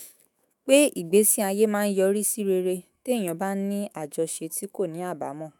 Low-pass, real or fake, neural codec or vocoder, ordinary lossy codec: none; fake; autoencoder, 48 kHz, 128 numbers a frame, DAC-VAE, trained on Japanese speech; none